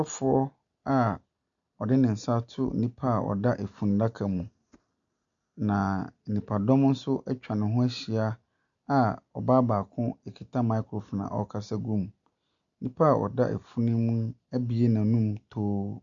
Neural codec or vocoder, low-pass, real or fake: none; 7.2 kHz; real